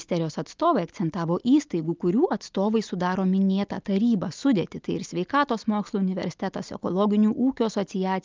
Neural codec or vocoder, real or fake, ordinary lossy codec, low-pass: none; real; Opus, 24 kbps; 7.2 kHz